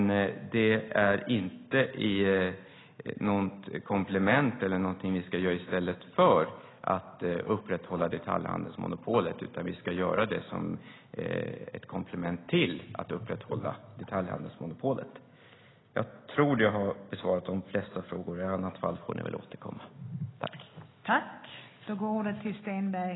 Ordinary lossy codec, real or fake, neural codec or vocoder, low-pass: AAC, 16 kbps; real; none; 7.2 kHz